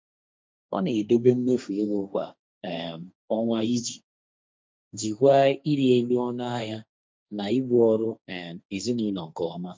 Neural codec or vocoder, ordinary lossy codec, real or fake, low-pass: codec, 16 kHz, 1.1 kbps, Voila-Tokenizer; none; fake; none